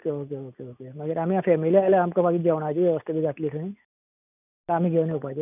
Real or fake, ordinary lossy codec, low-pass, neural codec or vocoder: real; none; 3.6 kHz; none